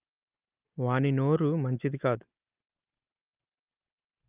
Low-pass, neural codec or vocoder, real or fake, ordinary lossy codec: 3.6 kHz; none; real; Opus, 32 kbps